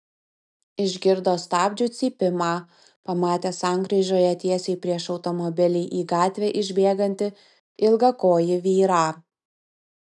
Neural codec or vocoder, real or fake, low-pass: none; real; 10.8 kHz